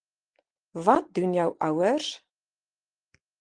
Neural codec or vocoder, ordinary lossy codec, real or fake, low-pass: none; Opus, 24 kbps; real; 9.9 kHz